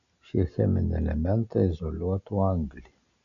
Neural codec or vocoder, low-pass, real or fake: none; 7.2 kHz; real